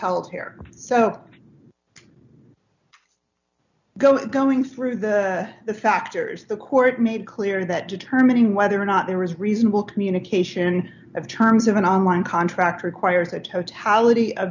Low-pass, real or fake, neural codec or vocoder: 7.2 kHz; real; none